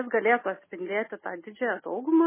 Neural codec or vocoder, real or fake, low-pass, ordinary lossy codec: none; real; 3.6 kHz; MP3, 16 kbps